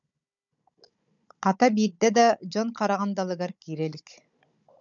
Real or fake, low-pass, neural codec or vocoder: fake; 7.2 kHz; codec, 16 kHz, 16 kbps, FunCodec, trained on Chinese and English, 50 frames a second